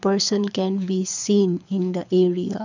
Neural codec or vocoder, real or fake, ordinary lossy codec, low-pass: codec, 24 kHz, 6 kbps, HILCodec; fake; none; 7.2 kHz